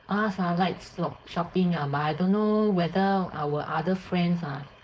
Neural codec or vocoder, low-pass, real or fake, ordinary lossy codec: codec, 16 kHz, 4.8 kbps, FACodec; none; fake; none